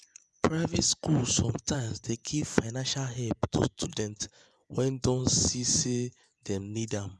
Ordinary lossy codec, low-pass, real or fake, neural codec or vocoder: none; none; fake; vocoder, 24 kHz, 100 mel bands, Vocos